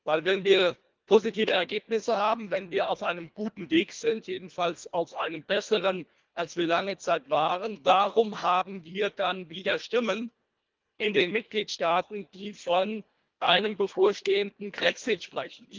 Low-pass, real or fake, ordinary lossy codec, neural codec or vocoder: 7.2 kHz; fake; Opus, 24 kbps; codec, 24 kHz, 1.5 kbps, HILCodec